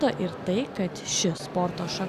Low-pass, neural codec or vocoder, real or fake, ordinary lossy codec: 14.4 kHz; none; real; Opus, 64 kbps